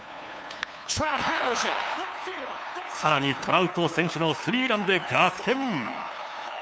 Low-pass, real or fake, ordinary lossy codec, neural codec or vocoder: none; fake; none; codec, 16 kHz, 2 kbps, FunCodec, trained on LibriTTS, 25 frames a second